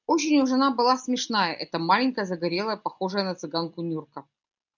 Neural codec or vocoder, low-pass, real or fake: none; 7.2 kHz; real